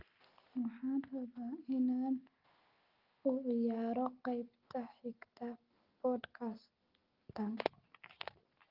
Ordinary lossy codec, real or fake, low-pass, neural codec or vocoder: Opus, 32 kbps; real; 5.4 kHz; none